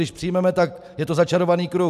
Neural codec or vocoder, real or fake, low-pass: none; real; 14.4 kHz